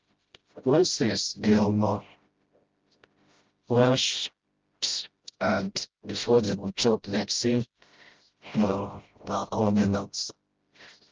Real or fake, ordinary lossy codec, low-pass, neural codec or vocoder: fake; Opus, 24 kbps; 7.2 kHz; codec, 16 kHz, 0.5 kbps, FreqCodec, smaller model